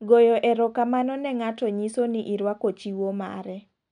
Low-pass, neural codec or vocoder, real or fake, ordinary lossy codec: 9.9 kHz; none; real; none